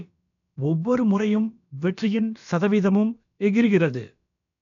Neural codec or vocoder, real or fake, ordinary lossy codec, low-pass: codec, 16 kHz, about 1 kbps, DyCAST, with the encoder's durations; fake; none; 7.2 kHz